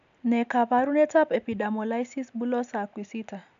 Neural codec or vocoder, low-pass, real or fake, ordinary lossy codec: none; 7.2 kHz; real; AAC, 96 kbps